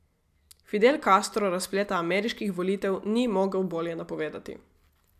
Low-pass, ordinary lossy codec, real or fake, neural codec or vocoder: 14.4 kHz; MP3, 96 kbps; real; none